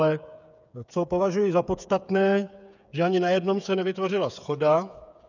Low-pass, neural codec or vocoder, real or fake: 7.2 kHz; codec, 16 kHz, 8 kbps, FreqCodec, smaller model; fake